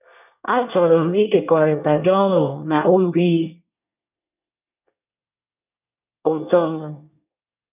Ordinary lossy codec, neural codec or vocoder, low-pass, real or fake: none; codec, 24 kHz, 1 kbps, SNAC; 3.6 kHz; fake